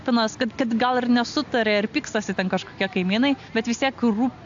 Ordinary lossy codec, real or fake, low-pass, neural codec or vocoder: AAC, 64 kbps; real; 7.2 kHz; none